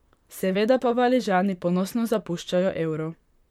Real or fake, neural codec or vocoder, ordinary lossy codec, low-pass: fake; vocoder, 44.1 kHz, 128 mel bands, Pupu-Vocoder; MP3, 96 kbps; 19.8 kHz